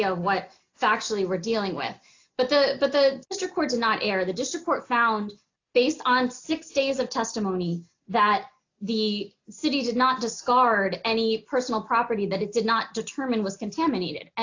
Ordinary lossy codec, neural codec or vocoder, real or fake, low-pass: AAC, 48 kbps; none; real; 7.2 kHz